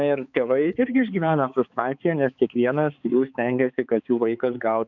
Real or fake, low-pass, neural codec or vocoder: fake; 7.2 kHz; codec, 16 kHz, 4 kbps, X-Codec, HuBERT features, trained on balanced general audio